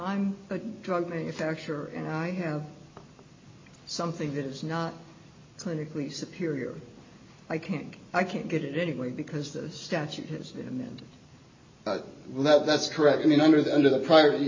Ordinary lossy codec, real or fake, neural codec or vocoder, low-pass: MP3, 32 kbps; real; none; 7.2 kHz